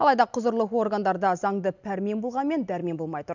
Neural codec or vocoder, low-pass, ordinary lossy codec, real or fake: none; 7.2 kHz; none; real